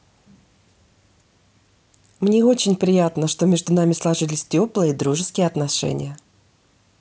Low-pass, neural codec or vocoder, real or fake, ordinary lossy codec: none; none; real; none